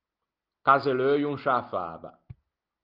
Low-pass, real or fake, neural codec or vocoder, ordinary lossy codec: 5.4 kHz; real; none; Opus, 24 kbps